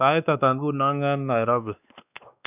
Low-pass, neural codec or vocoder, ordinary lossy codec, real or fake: 3.6 kHz; codec, 16 kHz, 2 kbps, X-Codec, WavLM features, trained on Multilingual LibriSpeech; none; fake